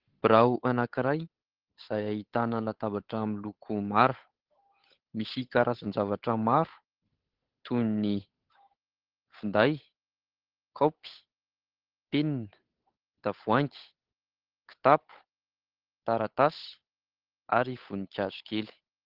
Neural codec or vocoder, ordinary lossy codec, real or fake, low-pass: codec, 16 kHz, 8 kbps, FunCodec, trained on Chinese and English, 25 frames a second; Opus, 16 kbps; fake; 5.4 kHz